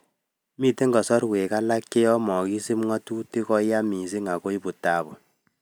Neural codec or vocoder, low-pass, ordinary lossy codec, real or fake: none; none; none; real